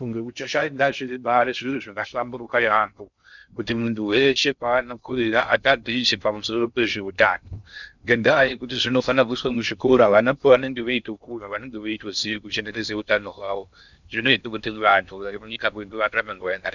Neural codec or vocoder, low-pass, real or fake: codec, 16 kHz in and 24 kHz out, 0.6 kbps, FocalCodec, streaming, 2048 codes; 7.2 kHz; fake